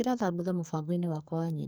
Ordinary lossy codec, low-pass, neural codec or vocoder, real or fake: none; none; codec, 44.1 kHz, 2.6 kbps, SNAC; fake